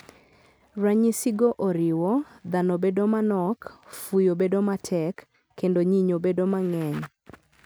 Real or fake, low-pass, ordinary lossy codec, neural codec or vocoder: real; none; none; none